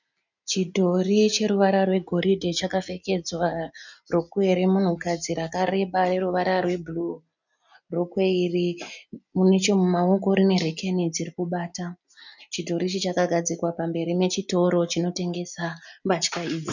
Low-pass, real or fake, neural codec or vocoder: 7.2 kHz; real; none